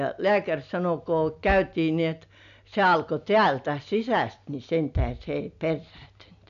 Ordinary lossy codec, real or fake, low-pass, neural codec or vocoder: none; real; 7.2 kHz; none